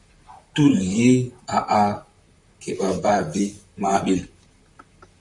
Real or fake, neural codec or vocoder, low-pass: fake; vocoder, 44.1 kHz, 128 mel bands, Pupu-Vocoder; 10.8 kHz